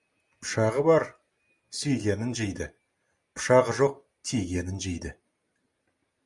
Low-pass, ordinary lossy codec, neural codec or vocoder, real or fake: 10.8 kHz; Opus, 64 kbps; none; real